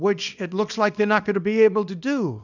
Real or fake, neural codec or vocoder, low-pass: fake; codec, 24 kHz, 0.9 kbps, WavTokenizer, small release; 7.2 kHz